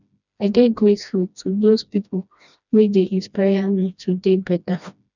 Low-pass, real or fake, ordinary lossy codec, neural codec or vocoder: 7.2 kHz; fake; none; codec, 16 kHz, 1 kbps, FreqCodec, smaller model